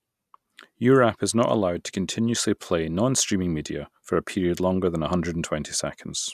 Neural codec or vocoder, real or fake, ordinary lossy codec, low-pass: none; real; none; 14.4 kHz